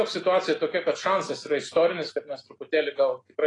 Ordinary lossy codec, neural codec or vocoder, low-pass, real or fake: AAC, 32 kbps; none; 10.8 kHz; real